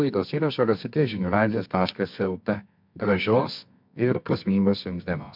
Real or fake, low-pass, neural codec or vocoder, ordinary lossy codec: fake; 5.4 kHz; codec, 24 kHz, 0.9 kbps, WavTokenizer, medium music audio release; MP3, 48 kbps